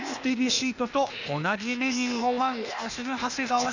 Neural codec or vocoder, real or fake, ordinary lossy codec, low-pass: codec, 16 kHz, 0.8 kbps, ZipCodec; fake; none; 7.2 kHz